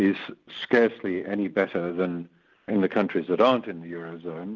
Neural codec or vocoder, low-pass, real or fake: none; 7.2 kHz; real